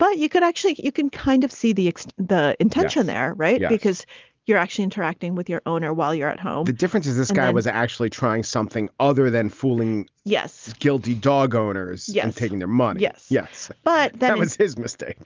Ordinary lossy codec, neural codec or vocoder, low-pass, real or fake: Opus, 32 kbps; none; 7.2 kHz; real